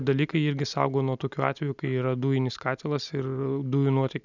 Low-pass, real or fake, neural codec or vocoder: 7.2 kHz; real; none